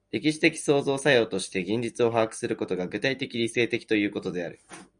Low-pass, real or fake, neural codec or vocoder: 9.9 kHz; real; none